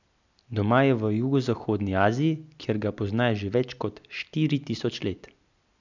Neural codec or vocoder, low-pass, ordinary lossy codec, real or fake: none; 7.2 kHz; none; real